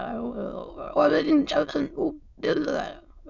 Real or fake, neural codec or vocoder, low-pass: fake; autoencoder, 22.05 kHz, a latent of 192 numbers a frame, VITS, trained on many speakers; 7.2 kHz